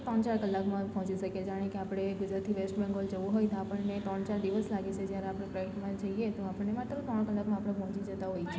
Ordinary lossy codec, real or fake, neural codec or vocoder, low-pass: none; real; none; none